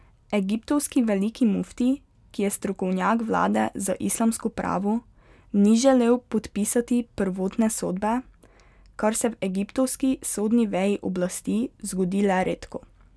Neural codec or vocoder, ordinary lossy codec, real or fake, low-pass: none; none; real; none